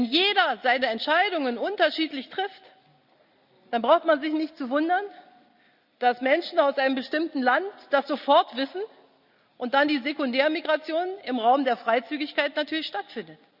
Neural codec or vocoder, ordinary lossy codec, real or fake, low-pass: none; Opus, 64 kbps; real; 5.4 kHz